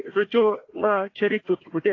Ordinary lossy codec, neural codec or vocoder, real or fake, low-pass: AAC, 32 kbps; codec, 16 kHz, 1 kbps, FunCodec, trained on Chinese and English, 50 frames a second; fake; 7.2 kHz